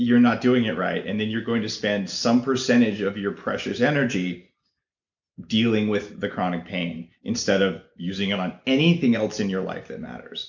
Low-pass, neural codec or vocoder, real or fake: 7.2 kHz; none; real